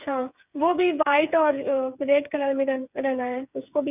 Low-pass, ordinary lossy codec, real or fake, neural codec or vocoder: 3.6 kHz; none; fake; codec, 16 kHz, 8 kbps, FreqCodec, smaller model